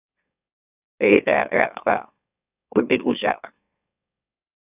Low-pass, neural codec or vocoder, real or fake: 3.6 kHz; autoencoder, 44.1 kHz, a latent of 192 numbers a frame, MeloTTS; fake